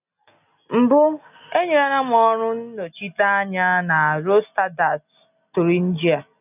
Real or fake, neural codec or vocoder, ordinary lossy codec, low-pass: real; none; none; 3.6 kHz